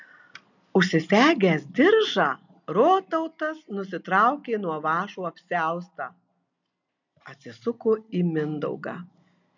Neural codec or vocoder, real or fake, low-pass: none; real; 7.2 kHz